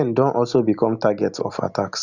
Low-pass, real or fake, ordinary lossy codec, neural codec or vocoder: 7.2 kHz; real; none; none